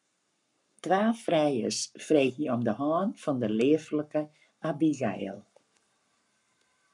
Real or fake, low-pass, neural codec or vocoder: fake; 10.8 kHz; codec, 44.1 kHz, 7.8 kbps, Pupu-Codec